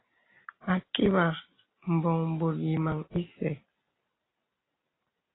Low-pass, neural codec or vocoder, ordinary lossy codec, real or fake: 7.2 kHz; none; AAC, 16 kbps; real